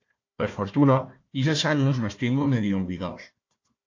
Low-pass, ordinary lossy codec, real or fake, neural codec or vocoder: 7.2 kHz; AAC, 48 kbps; fake; codec, 16 kHz, 1 kbps, FunCodec, trained on Chinese and English, 50 frames a second